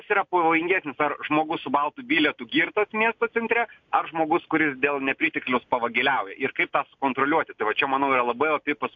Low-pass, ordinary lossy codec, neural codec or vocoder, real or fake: 7.2 kHz; MP3, 64 kbps; none; real